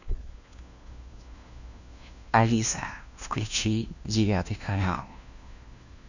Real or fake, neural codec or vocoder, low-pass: fake; codec, 16 kHz, 1 kbps, FunCodec, trained on LibriTTS, 50 frames a second; 7.2 kHz